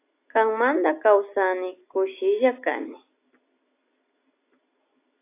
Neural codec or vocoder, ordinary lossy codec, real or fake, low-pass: none; AAC, 24 kbps; real; 3.6 kHz